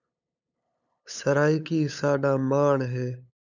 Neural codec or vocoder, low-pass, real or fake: codec, 16 kHz, 8 kbps, FunCodec, trained on LibriTTS, 25 frames a second; 7.2 kHz; fake